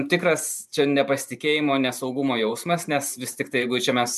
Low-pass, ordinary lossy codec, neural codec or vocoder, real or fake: 14.4 kHz; MP3, 96 kbps; vocoder, 44.1 kHz, 128 mel bands every 512 samples, BigVGAN v2; fake